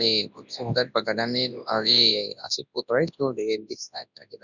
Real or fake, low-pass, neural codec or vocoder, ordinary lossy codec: fake; 7.2 kHz; codec, 24 kHz, 0.9 kbps, WavTokenizer, large speech release; none